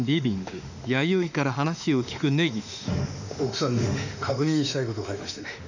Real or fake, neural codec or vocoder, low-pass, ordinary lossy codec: fake; autoencoder, 48 kHz, 32 numbers a frame, DAC-VAE, trained on Japanese speech; 7.2 kHz; none